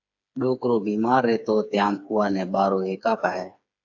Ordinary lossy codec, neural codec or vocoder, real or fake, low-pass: AAC, 48 kbps; codec, 16 kHz, 4 kbps, FreqCodec, smaller model; fake; 7.2 kHz